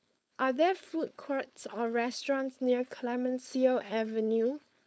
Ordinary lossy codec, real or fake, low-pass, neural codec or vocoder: none; fake; none; codec, 16 kHz, 4.8 kbps, FACodec